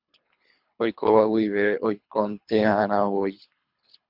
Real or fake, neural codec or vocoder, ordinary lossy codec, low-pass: fake; codec, 24 kHz, 3 kbps, HILCodec; MP3, 48 kbps; 5.4 kHz